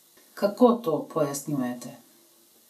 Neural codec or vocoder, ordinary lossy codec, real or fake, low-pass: none; none; real; 14.4 kHz